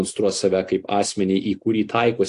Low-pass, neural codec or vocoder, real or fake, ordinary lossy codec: 10.8 kHz; none; real; AAC, 48 kbps